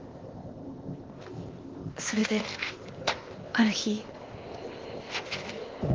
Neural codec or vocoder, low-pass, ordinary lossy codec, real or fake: codec, 16 kHz, 0.8 kbps, ZipCodec; 7.2 kHz; Opus, 16 kbps; fake